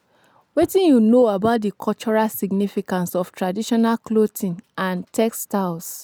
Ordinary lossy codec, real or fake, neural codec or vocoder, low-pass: none; real; none; none